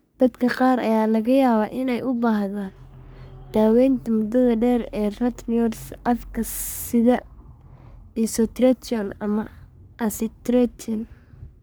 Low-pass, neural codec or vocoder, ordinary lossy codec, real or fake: none; codec, 44.1 kHz, 3.4 kbps, Pupu-Codec; none; fake